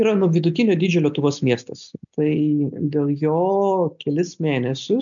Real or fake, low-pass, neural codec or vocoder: real; 7.2 kHz; none